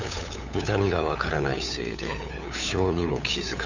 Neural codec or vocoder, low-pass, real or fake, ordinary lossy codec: codec, 16 kHz, 8 kbps, FunCodec, trained on LibriTTS, 25 frames a second; 7.2 kHz; fake; none